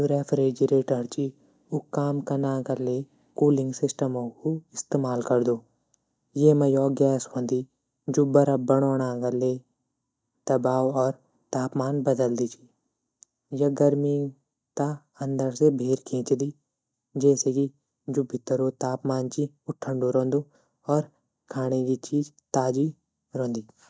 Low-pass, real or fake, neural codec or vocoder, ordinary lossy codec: none; real; none; none